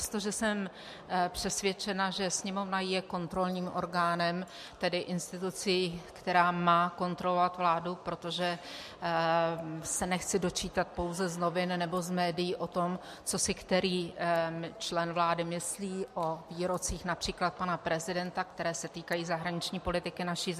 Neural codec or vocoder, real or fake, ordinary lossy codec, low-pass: none; real; MP3, 64 kbps; 14.4 kHz